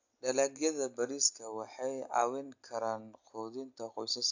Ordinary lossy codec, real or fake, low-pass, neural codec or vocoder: AAC, 48 kbps; real; 7.2 kHz; none